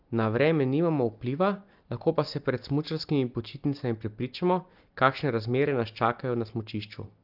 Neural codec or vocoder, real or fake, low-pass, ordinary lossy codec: none; real; 5.4 kHz; Opus, 24 kbps